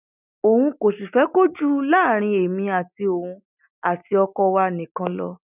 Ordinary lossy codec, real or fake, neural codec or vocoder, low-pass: none; real; none; 3.6 kHz